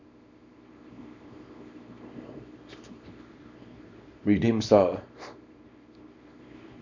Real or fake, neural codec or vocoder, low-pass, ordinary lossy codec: fake; codec, 24 kHz, 0.9 kbps, WavTokenizer, small release; 7.2 kHz; none